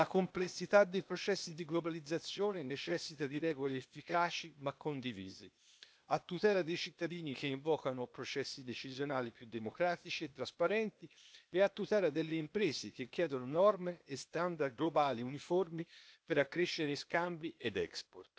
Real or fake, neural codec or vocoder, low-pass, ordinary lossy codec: fake; codec, 16 kHz, 0.8 kbps, ZipCodec; none; none